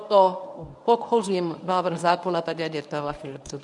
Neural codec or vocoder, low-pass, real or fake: codec, 24 kHz, 0.9 kbps, WavTokenizer, medium speech release version 1; 10.8 kHz; fake